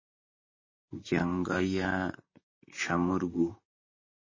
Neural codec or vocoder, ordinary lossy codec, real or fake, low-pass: vocoder, 44.1 kHz, 128 mel bands, Pupu-Vocoder; MP3, 32 kbps; fake; 7.2 kHz